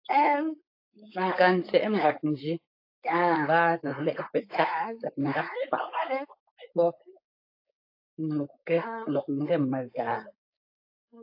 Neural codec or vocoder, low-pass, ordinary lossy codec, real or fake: codec, 16 kHz, 4.8 kbps, FACodec; 5.4 kHz; AAC, 32 kbps; fake